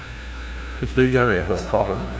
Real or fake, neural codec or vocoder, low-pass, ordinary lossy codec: fake; codec, 16 kHz, 0.5 kbps, FunCodec, trained on LibriTTS, 25 frames a second; none; none